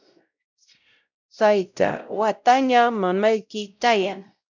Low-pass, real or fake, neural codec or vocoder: 7.2 kHz; fake; codec, 16 kHz, 0.5 kbps, X-Codec, WavLM features, trained on Multilingual LibriSpeech